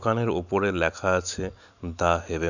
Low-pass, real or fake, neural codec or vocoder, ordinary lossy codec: 7.2 kHz; real; none; none